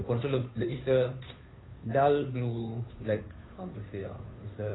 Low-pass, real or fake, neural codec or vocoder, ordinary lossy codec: 7.2 kHz; fake; codec, 16 kHz, 2 kbps, FunCodec, trained on Chinese and English, 25 frames a second; AAC, 16 kbps